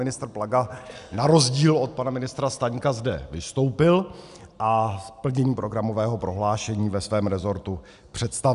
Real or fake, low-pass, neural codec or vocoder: real; 10.8 kHz; none